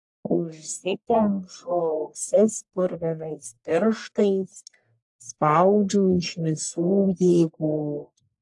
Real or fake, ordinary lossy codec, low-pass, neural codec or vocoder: fake; AAC, 64 kbps; 10.8 kHz; codec, 44.1 kHz, 1.7 kbps, Pupu-Codec